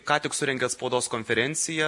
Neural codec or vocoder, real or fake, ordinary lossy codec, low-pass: none; real; MP3, 48 kbps; 10.8 kHz